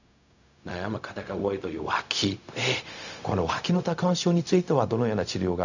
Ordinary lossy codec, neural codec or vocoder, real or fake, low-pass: none; codec, 16 kHz, 0.4 kbps, LongCat-Audio-Codec; fake; 7.2 kHz